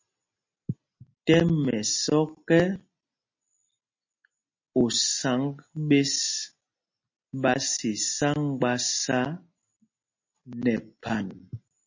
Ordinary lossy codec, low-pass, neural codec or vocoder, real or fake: MP3, 32 kbps; 7.2 kHz; none; real